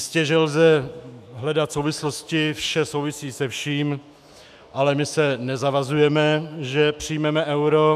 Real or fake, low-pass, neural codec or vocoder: fake; 14.4 kHz; autoencoder, 48 kHz, 128 numbers a frame, DAC-VAE, trained on Japanese speech